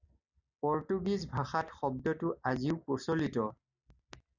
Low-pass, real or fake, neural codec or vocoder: 7.2 kHz; real; none